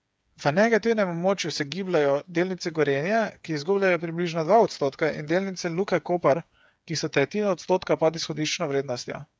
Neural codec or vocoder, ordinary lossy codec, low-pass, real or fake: codec, 16 kHz, 8 kbps, FreqCodec, smaller model; none; none; fake